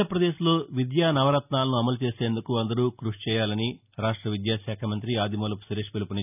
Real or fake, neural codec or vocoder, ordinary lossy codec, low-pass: real; none; none; 3.6 kHz